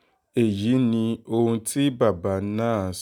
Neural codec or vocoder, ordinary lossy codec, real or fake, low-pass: none; none; real; 19.8 kHz